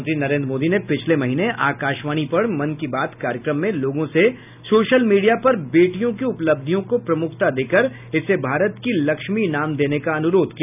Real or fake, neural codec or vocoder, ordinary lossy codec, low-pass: real; none; none; 3.6 kHz